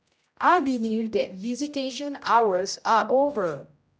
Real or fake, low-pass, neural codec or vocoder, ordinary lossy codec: fake; none; codec, 16 kHz, 0.5 kbps, X-Codec, HuBERT features, trained on general audio; none